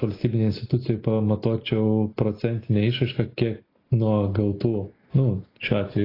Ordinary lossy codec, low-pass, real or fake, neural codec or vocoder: AAC, 24 kbps; 5.4 kHz; real; none